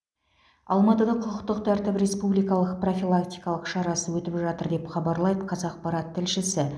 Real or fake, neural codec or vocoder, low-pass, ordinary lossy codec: real; none; none; none